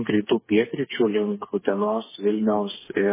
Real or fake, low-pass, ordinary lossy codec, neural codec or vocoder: fake; 3.6 kHz; MP3, 16 kbps; codec, 16 kHz, 4 kbps, FreqCodec, smaller model